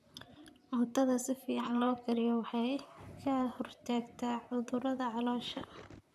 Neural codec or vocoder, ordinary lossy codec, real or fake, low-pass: vocoder, 44.1 kHz, 128 mel bands, Pupu-Vocoder; none; fake; 14.4 kHz